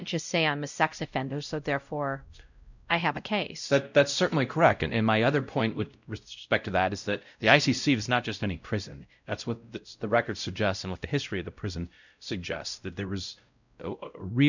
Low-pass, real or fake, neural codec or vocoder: 7.2 kHz; fake; codec, 16 kHz, 0.5 kbps, X-Codec, WavLM features, trained on Multilingual LibriSpeech